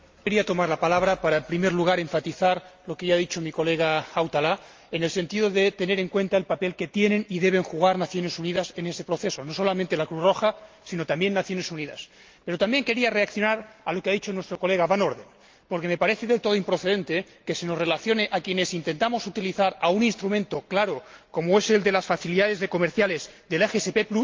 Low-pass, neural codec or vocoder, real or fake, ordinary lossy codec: 7.2 kHz; none; real; Opus, 32 kbps